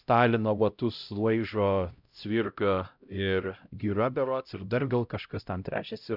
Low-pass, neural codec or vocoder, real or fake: 5.4 kHz; codec, 16 kHz, 0.5 kbps, X-Codec, HuBERT features, trained on LibriSpeech; fake